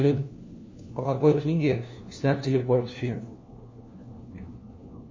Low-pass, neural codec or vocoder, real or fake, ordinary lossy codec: 7.2 kHz; codec, 16 kHz, 1 kbps, FunCodec, trained on LibriTTS, 50 frames a second; fake; MP3, 32 kbps